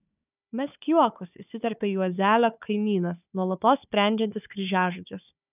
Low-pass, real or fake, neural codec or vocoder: 3.6 kHz; fake; codec, 16 kHz, 4 kbps, FunCodec, trained on Chinese and English, 50 frames a second